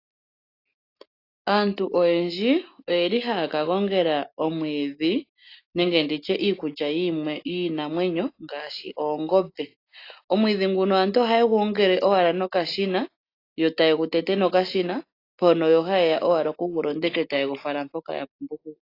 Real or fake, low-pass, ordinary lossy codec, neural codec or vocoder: real; 5.4 kHz; AAC, 32 kbps; none